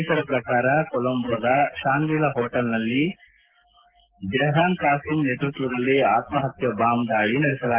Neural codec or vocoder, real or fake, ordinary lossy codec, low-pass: none; real; Opus, 16 kbps; 3.6 kHz